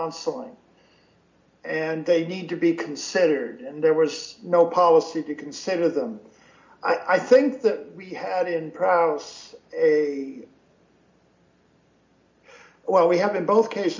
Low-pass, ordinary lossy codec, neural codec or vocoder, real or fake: 7.2 kHz; MP3, 64 kbps; none; real